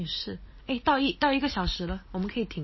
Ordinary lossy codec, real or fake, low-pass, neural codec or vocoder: MP3, 24 kbps; real; 7.2 kHz; none